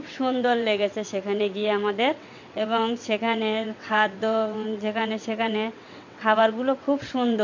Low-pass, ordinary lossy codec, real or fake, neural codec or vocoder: 7.2 kHz; MP3, 48 kbps; fake; vocoder, 22.05 kHz, 80 mel bands, WaveNeXt